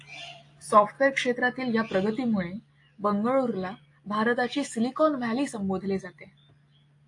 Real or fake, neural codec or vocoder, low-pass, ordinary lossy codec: real; none; 10.8 kHz; AAC, 48 kbps